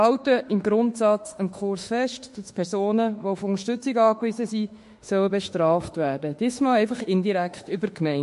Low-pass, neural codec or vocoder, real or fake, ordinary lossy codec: 14.4 kHz; autoencoder, 48 kHz, 32 numbers a frame, DAC-VAE, trained on Japanese speech; fake; MP3, 48 kbps